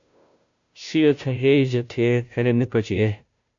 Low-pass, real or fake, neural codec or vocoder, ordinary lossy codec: 7.2 kHz; fake; codec, 16 kHz, 0.5 kbps, FunCodec, trained on Chinese and English, 25 frames a second; AAC, 64 kbps